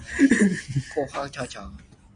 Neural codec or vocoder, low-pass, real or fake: none; 9.9 kHz; real